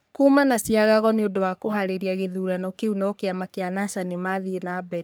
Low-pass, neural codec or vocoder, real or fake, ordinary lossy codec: none; codec, 44.1 kHz, 3.4 kbps, Pupu-Codec; fake; none